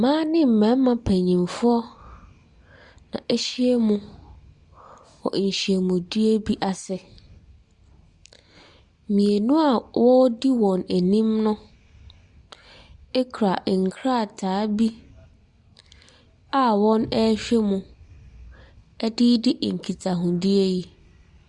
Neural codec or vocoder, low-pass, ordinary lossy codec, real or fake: none; 10.8 kHz; Opus, 64 kbps; real